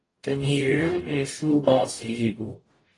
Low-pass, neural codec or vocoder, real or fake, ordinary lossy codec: 10.8 kHz; codec, 44.1 kHz, 0.9 kbps, DAC; fake; AAC, 32 kbps